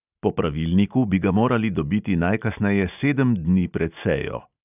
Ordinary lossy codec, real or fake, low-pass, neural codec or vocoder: none; real; 3.6 kHz; none